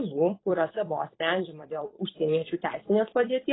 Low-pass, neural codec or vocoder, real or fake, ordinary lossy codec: 7.2 kHz; vocoder, 44.1 kHz, 128 mel bands every 256 samples, BigVGAN v2; fake; AAC, 16 kbps